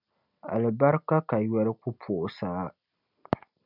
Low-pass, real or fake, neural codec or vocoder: 5.4 kHz; real; none